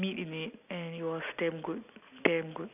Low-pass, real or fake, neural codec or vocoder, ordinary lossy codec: 3.6 kHz; real; none; none